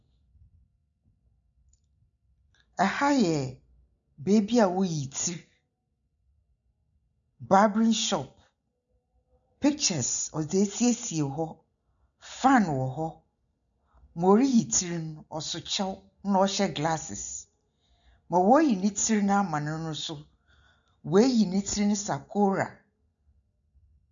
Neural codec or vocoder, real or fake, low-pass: none; real; 7.2 kHz